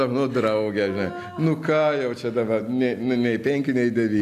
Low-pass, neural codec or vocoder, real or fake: 14.4 kHz; none; real